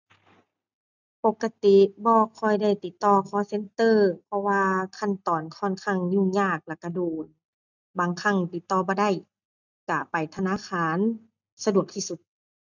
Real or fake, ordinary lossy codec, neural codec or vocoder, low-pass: real; none; none; 7.2 kHz